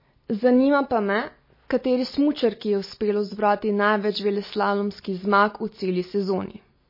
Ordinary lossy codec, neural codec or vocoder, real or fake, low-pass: MP3, 24 kbps; none; real; 5.4 kHz